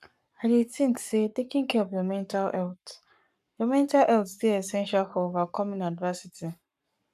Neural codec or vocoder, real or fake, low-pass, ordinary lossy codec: codec, 44.1 kHz, 7.8 kbps, Pupu-Codec; fake; 14.4 kHz; none